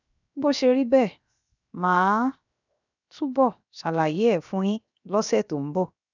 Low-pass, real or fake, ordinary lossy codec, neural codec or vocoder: 7.2 kHz; fake; none; codec, 16 kHz, 0.7 kbps, FocalCodec